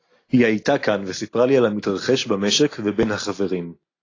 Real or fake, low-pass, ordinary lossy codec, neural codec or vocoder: real; 7.2 kHz; AAC, 32 kbps; none